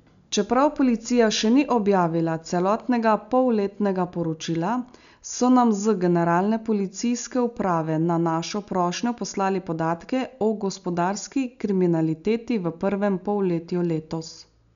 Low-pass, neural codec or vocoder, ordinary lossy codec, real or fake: 7.2 kHz; none; none; real